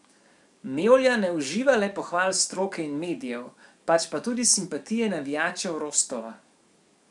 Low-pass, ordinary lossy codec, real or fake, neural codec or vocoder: 10.8 kHz; none; fake; codec, 44.1 kHz, 7.8 kbps, DAC